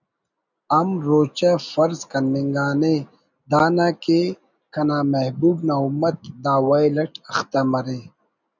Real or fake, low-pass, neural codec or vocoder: real; 7.2 kHz; none